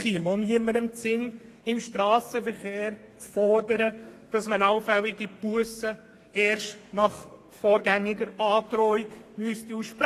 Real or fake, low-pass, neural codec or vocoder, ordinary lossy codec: fake; 14.4 kHz; codec, 32 kHz, 1.9 kbps, SNAC; AAC, 48 kbps